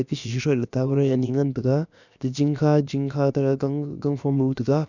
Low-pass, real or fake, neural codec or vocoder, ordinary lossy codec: 7.2 kHz; fake; codec, 16 kHz, about 1 kbps, DyCAST, with the encoder's durations; none